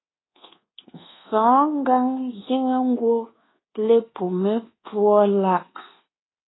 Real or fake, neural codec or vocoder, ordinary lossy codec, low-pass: fake; codec, 24 kHz, 1.2 kbps, DualCodec; AAC, 16 kbps; 7.2 kHz